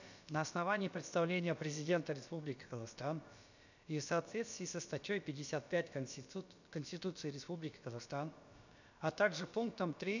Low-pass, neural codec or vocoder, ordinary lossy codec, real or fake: 7.2 kHz; codec, 16 kHz, about 1 kbps, DyCAST, with the encoder's durations; none; fake